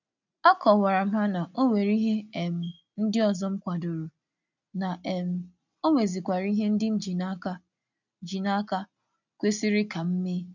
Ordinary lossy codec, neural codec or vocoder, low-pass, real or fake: none; none; 7.2 kHz; real